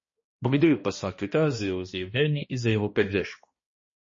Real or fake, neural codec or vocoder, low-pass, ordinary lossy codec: fake; codec, 16 kHz, 1 kbps, X-Codec, HuBERT features, trained on balanced general audio; 7.2 kHz; MP3, 32 kbps